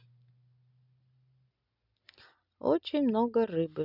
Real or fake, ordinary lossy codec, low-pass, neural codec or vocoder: real; none; 5.4 kHz; none